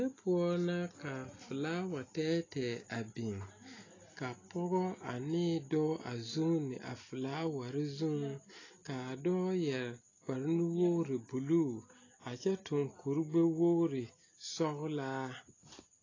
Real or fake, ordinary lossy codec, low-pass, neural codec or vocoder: real; AAC, 32 kbps; 7.2 kHz; none